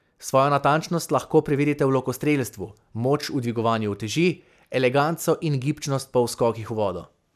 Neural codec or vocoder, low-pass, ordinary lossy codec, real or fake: none; 14.4 kHz; none; real